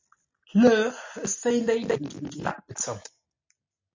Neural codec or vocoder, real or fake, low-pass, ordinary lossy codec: none; real; 7.2 kHz; MP3, 48 kbps